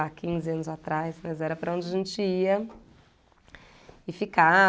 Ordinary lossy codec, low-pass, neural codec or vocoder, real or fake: none; none; none; real